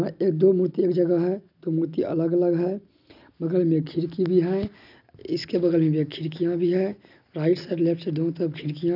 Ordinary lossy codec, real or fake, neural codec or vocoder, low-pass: none; real; none; 5.4 kHz